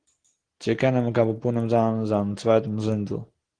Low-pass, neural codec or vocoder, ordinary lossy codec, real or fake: 9.9 kHz; none; Opus, 16 kbps; real